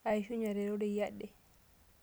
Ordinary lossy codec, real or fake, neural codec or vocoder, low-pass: none; real; none; none